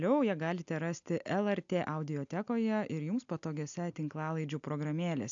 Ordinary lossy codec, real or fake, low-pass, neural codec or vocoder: AAC, 64 kbps; real; 7.2 kHz; none